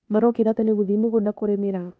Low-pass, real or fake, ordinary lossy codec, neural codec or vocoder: none; fake; none; codec, 16 kHz, 0.8 kbps, ZipCodec